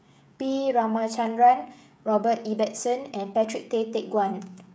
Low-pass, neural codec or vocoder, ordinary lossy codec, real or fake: none; codec, 16 kHz, 16 kbps, FreqCodec, smaller model; none; fake